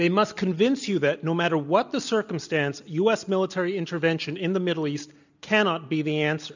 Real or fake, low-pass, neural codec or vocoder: real; 7.2 kHz; none